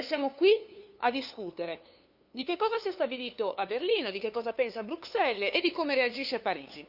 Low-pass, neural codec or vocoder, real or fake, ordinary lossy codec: 5.4 kHz; codec, 16 kHz, 2 kbps, FunCodec, trained on LibriTTS, 25 frames a second; fake; none